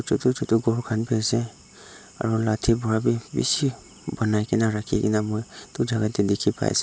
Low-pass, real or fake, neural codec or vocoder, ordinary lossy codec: none; real; none; none